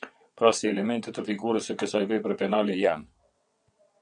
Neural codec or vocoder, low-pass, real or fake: vocoder, 22.05 kHz, 80 mel bands, WaveNeXt; 9.9 kHz; fake